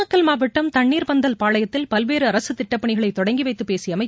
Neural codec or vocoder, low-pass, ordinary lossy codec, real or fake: none; none; none; real